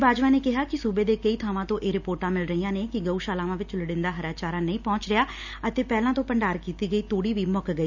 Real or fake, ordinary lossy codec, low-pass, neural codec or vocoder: real; none; 7.2 kHz; none